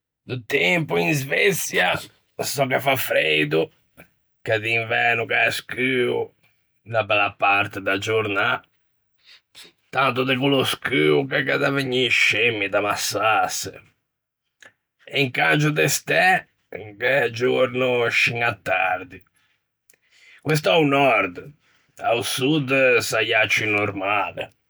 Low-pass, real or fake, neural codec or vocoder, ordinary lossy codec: none; real; none; none